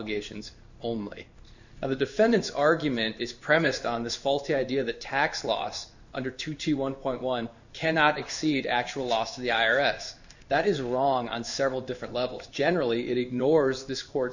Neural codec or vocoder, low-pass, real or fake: codec, 16 kHz in and 24 kHz out, 1 kbps, XY-Tokenizer; 7.2 kHz; fake